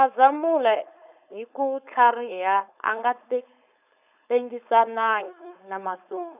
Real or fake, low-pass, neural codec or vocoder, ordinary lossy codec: fake; 3.6 kHz; codec, 16 kHz, 4.8 kbps, FACodec; none